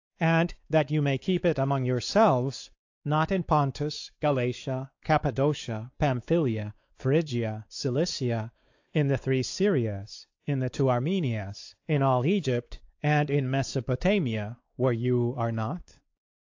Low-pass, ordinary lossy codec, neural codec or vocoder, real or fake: 7.2 kHz; AAC, 48 kbps; codec, 16 kHz, 4 kbps, X-Codec, WavLM features, trained on Multilingual LibriSpeech; fake